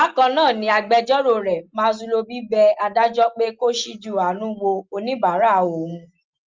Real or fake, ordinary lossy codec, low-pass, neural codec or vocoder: real; Opus, 32 kbps; 7.2 kHz; none